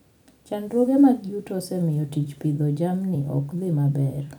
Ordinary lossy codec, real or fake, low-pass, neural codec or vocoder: none; fake; none; vocoder, 44.1 kHz, 128 mel bands every 256 samples, BigVGAN v2